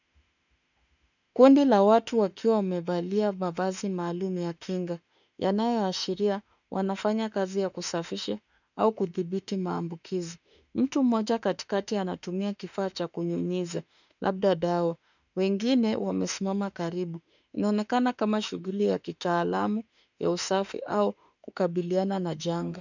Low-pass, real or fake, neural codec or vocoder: 7.2 kHz; fake; autoencoder, 48 kHz, 32 numbers a frame, DAC-VAE, trained on Japanese speech